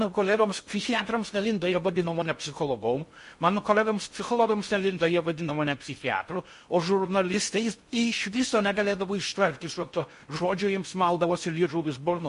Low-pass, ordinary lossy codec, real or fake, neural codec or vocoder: 10.8 kHz; MP3, 48 kbps; fake; codec, 16 kHz in and 24 kHz out, 0.6 kbps, FocalCodec, streaming, 2048 codes